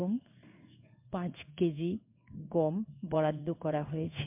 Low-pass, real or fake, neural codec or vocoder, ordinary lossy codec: 3.6 kHz; fake; codec, 16 kHz in and 24 kHz out, 1 kbps, XY-Tokenizer; MP3, 32 kbps